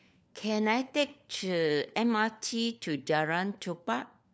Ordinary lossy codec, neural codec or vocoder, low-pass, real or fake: none; codec, 16 kHz, 4 kbps, FunCodec, trained on LibriTTS, 50 frames a second; none; fake